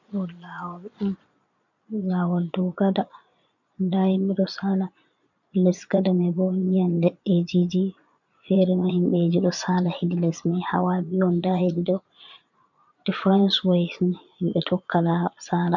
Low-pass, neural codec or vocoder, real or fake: 7.2 kHz; vocoder, 22.05 kHz, 80 mel bands, Vocos; fake